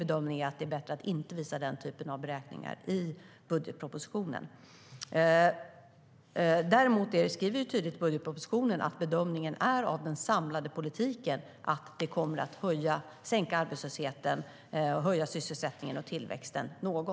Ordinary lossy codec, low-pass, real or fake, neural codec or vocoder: none; none; real; none